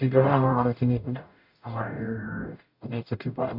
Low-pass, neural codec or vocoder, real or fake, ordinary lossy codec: 5.4 kHz; codec, 44.1 kHz, 0.9 kbps, DAC; fake; AAC, 48 kbps